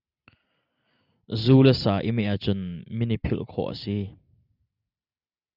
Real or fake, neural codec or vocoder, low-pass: real; none; 5.4 kHz